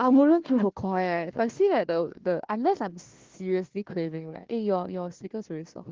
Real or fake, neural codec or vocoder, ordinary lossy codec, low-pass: fake; codec, 16 kHz, 1 kbps, FunCodec, trained on Chinese and English, 50 frames a second; Opus, 16 kbps; 7.2 kHz